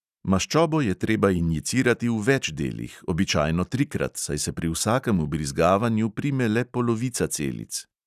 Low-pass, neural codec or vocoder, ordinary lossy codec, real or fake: 14.4 kHz; none; none; real